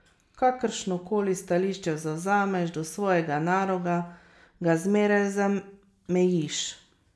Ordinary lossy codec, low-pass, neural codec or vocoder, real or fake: none; none; none; real